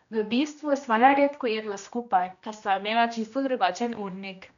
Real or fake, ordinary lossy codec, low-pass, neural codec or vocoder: fake; none; 7.2 kHz; codec, 16 kHz, 1 kbps, X-Codec, HuBERT features, trained on general audio